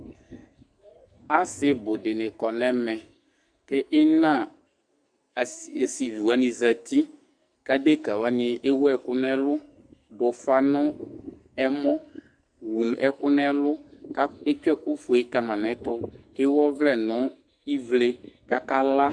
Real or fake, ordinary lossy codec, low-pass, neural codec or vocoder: fake; Opus, 64 kbps; 9.9 kHz; codec, 44.1 kHz, 2.6 kbps, SNAC